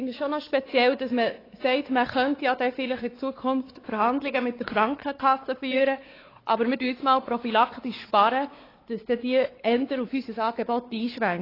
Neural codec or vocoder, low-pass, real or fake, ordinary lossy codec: codec, 16 kHz, 4 kbps, X-Codec, WavLM features, trained on Multilingual LibriSpeech; 5.4 kHz; fake; AAC, 24 kbps